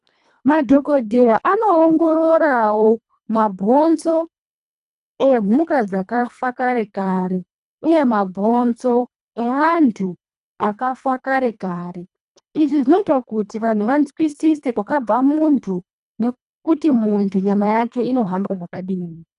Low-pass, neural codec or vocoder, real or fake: 10.8 kHz; codec, 24 kHz, 1.5 kbps, HILCodec; fake